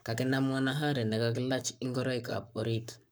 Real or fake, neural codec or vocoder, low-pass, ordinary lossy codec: fake; codec, 44.1 kHz, 7.8 kbps, Pupu-Codec; none; none